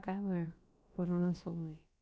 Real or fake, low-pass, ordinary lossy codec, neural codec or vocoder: fake; none; none; codec, 16 kHz, about 1 kbps, DyCAST, with the encoder's durations